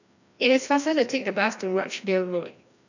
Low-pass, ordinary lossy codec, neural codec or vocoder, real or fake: 7.2 kHz; none; codec, 16 kHz, 1 kbps, FreqCodec, larger model; fake